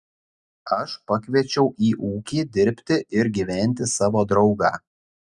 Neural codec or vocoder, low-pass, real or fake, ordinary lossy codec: none; 10.8 kHz; real; Opus, 64 kbps